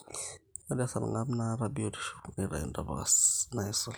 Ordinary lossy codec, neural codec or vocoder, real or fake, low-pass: none; none; real; none